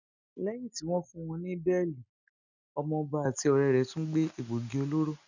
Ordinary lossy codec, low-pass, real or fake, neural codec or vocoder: none; 7.2 kHz; real; none